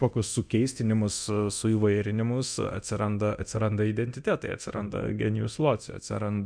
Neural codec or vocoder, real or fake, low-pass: codec, 24 kHz, 0.9 kbps, DualCodec; fake; 9.9 kHz